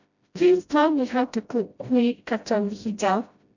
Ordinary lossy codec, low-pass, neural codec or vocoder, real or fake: none; 7.2 kHz; codec, 16 kHz, 0.5 kbps, FreqCodec, smaller model; fake